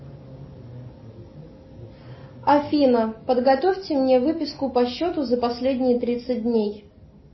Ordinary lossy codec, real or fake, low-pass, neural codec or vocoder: MP3, 24 kbps; real; 7.2 kHz; none